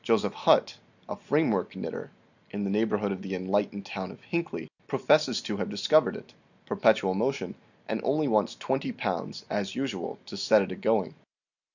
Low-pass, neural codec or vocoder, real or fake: 7.2 kHz; none; real